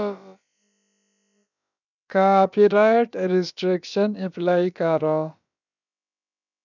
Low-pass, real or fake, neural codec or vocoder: 7.2 kHz; fake; codec, 16 kHz, about 1 kbps, DyCAST, with the encoder's durations